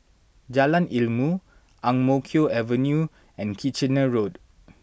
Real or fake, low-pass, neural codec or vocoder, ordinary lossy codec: real; none; none; none